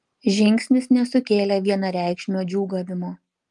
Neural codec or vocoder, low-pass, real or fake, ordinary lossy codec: none; 10.8 kHz; real; Opus, 32 kbps